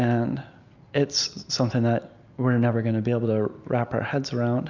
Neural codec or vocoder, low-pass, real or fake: none; 7.2 kHz; real